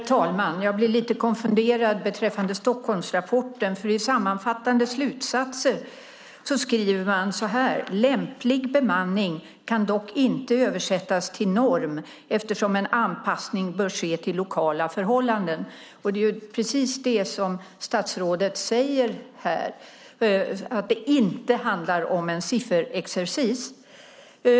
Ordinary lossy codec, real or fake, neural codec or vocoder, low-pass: none; real; none; none